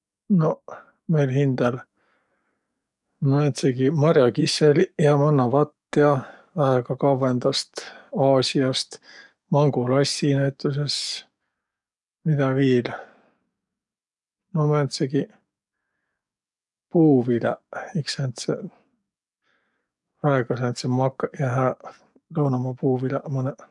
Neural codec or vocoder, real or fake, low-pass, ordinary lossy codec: codec, 44.1 kHz, 7.8 kbps, DAC; fake; 10.8 kHz; none